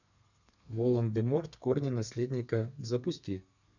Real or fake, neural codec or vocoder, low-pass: fake; codec, 32 kHz, 1.9 kbps, SNAC; 7.2 kHz